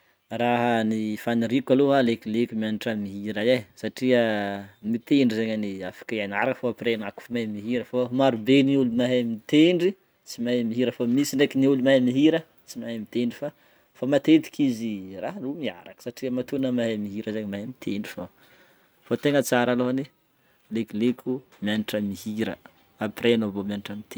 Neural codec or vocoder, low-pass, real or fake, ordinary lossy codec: none; none; real; none